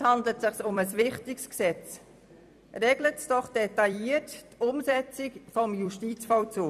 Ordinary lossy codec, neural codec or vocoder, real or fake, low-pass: none; vocoder, 44.1 kHz, 128 mel bands every 256 samples, BigVGAN v2; fake; 14.4 kHz